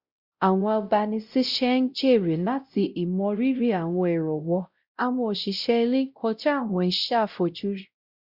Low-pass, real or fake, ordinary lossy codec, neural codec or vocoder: 5.4 kHz; fake; Opus, 64 kbps; codec, 16 kHz, 0.5 kbps, X-Codec, WavLM features, trained on Multilingual LibriSpeech